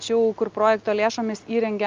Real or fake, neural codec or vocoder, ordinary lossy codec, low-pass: real; none; Opus, 24 kbps; 7.2 kHz